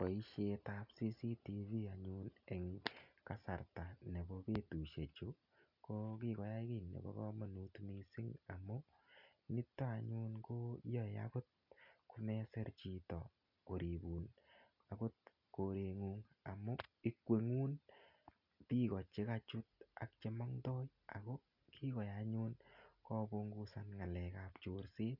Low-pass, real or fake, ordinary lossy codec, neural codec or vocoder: 5.4 kHz; real; none; none